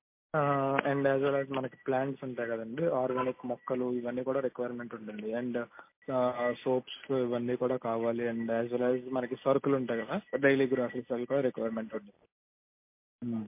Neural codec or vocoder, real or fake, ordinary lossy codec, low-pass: none; real; MP3, 24 kbps; 3.6 kHz